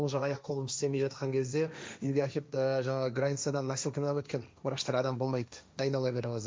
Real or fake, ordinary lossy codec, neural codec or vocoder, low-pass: fake; none; codec, 16 kHz, 1.1 kbps, Voila-Tokenizer; none